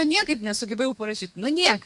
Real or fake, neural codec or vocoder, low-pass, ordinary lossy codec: fake; codec, 32 kHz, 1.9 kbps, SNAC; 10.8 kHz; MP3, 96 kbps